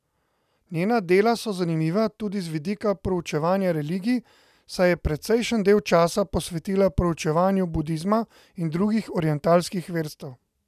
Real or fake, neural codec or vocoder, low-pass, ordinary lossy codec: real; none; 14.4 kHz; none